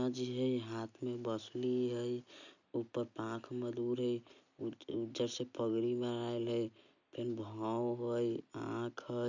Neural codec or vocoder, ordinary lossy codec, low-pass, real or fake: none; none; 7.2 kHz; real